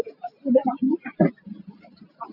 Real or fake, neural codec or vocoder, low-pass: real; none; 5.4 kHz